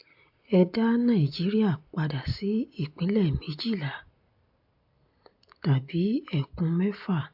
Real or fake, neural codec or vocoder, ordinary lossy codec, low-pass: real; none; AAC, 48 kbps; 5.4 kHz